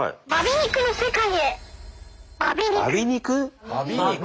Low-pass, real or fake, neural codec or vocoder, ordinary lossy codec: none; real; none; none